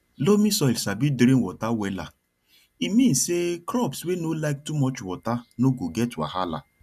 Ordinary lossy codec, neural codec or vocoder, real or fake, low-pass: none; none; real; 14.4 kHz